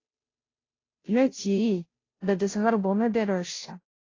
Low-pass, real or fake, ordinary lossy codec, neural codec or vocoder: 7.2 kHz; fake; AAC, 32 kbps; codec, 16 kHz, 0.5 kbps, FunCodec, trained on Chinese and English, 25 frames a second